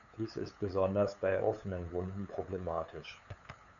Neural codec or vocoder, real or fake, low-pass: codec, 16 kHz, 8 kbps, FunCodec, trained on LibriTTS, 25 frames a second; fake; 7.2 kHz